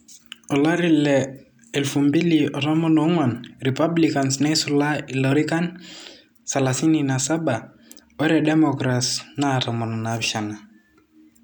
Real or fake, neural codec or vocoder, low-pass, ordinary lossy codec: real; none; none; none